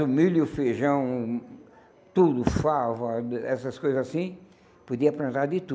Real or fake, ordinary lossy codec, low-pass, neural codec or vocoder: real; none; none; none